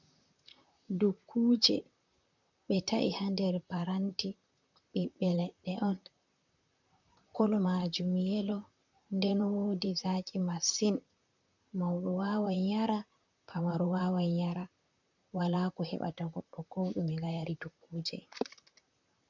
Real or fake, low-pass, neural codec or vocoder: fake; 7.2 kHz; vocoder, 44.1 kHz, 128 mel bands, Pupu-Vocoder